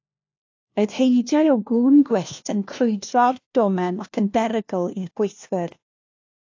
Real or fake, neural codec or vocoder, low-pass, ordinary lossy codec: fake; codec, 16 kHz, 1 kbps, FunCodec, trained on LibriTTS, 50 frames a second; 7.2 kHz; AAC, 48 kbps